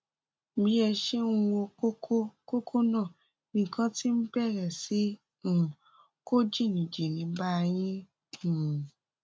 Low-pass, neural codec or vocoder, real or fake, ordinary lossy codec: none; none; real; none